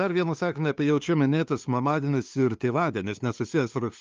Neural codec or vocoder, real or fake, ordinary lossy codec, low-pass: codec, 16 kHz, 2 kbps, FunCodec, trained on LibriTTS, 25 frames a second; fake; Opus, 24 kbps; 7.2 kHz